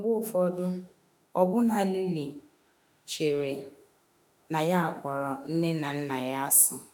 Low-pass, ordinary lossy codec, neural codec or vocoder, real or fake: none; none; autoencoder, 48 kHz, 32 numbers a frame, DAC-VAE, trained on Japanese speech; fake